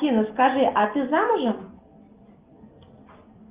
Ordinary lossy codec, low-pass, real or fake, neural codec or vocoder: Opus, 32 kbps; 3.6 kHz; real; none